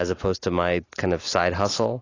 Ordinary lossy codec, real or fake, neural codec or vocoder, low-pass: AAC, 32 kbps; real; none; 7.2 kHz